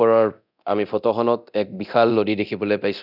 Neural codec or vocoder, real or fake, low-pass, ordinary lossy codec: codec, 24 kHz, 0.9 kbps, DualCodec; fake; 5.4 kHz; none